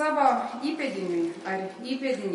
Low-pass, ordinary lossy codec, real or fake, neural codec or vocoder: 19.8 kHz; MP3, 48 kbps; real; none